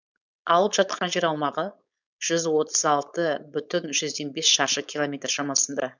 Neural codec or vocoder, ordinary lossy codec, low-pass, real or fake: codec, 16 kHz, 4.8 kbps, FACodec; none; 7.2 kHz; fake